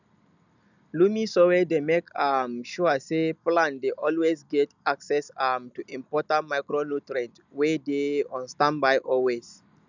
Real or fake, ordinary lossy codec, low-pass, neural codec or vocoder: real; none; 7.2 kHz; none